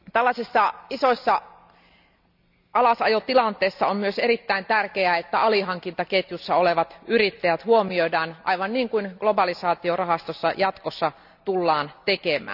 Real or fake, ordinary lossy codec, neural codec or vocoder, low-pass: real; none; none; 5.4 kHz